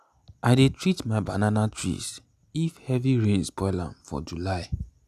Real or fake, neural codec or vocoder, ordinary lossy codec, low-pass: real; none; none; 14.4 kHz